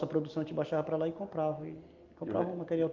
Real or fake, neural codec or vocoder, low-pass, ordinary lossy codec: real; none; 7.2 kHz; Opus, 24 kbps